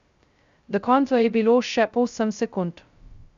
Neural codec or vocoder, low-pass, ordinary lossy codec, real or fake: codec, 16 kHz, 0.2 kbps, FocalCodec; 7.2 kHz; Opus, 64 kbps; fake